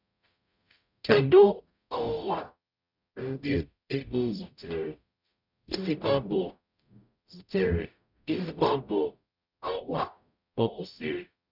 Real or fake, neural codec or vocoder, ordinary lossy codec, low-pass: fake; codec, 44.1 kHz, 0.9 kbps, DAC; none; 5.4 kHz